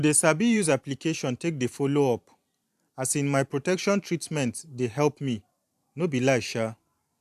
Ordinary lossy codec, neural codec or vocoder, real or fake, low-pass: AAC, 96 kbps; vocoder, 44.1 kHz, 128 mel bands every 512 samples, BigVGAN v2; fake; 14.4 kHz